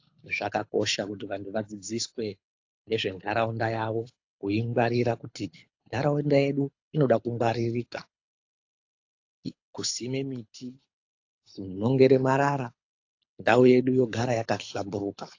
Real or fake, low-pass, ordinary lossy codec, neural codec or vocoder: fake; 7.2 kHz; AAC, 48 kbps; codec, 24 kHz, 6 kbps, HILCodec